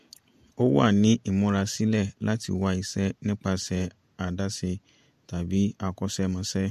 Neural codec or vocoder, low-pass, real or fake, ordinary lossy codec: vocoder, 48 kHz, 128 mel bands, Vocos; 14.4 kHz; fake; MP3, 64 kbps